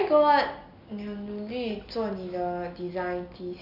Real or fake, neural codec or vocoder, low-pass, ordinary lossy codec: real; none; 5.4 kHz; none